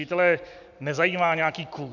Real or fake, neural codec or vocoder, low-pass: real; none; 7.2 kHz